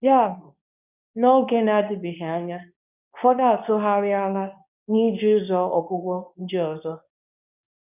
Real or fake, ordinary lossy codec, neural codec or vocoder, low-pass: fake; none; codec, 24 kHz, 0.9 kbps, WavTokenizer, medium speech release version 2; 3.6 kHz